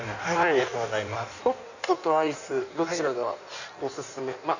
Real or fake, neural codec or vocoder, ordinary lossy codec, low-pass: fake; codec, 16 kHz in and 24 kHz out, 1.1 kbps, FireRedTTS-2 codec; none; 7.2 kHz